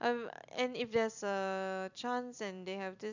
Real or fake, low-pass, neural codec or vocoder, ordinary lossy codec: fake; 7.2 kHz; vocoder, 44.1 kHz, 128 mel bands every 256 samples, BigVGAN v2; none